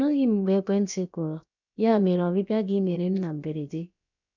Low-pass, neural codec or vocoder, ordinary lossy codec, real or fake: 7.2 kHz; codec, 16 kHz, about 1 kbps, DyCAST, with the encoder's durations; none; fake